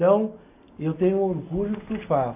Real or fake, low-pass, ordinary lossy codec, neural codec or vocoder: real; 3.6 kHz; AAC, 32 kbps; none